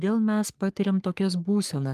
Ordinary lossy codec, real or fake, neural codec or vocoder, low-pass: Opus, 32 kbps; fake; codec, 44.1 kHz, 3.4 kbps, Pupu-Codec; 14.4 kHz